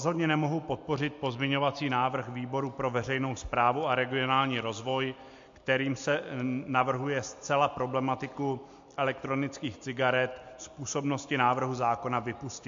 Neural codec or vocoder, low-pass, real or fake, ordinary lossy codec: none; 7.2 kHz; real; MP3, 48 kbps